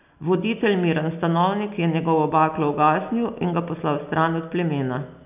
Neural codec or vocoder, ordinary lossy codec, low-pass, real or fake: none; none; 3.6 kHz; real